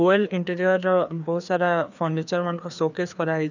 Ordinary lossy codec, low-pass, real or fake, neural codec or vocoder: none; 7.2 kHz; fake; codec, 16 kHz, 2 kbps, FreqCodec, larger model